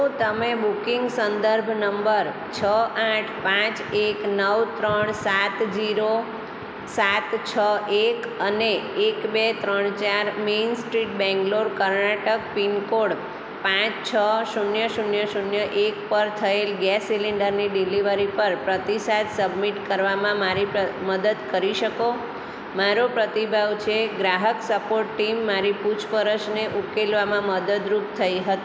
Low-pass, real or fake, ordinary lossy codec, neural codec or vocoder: none; real; none; none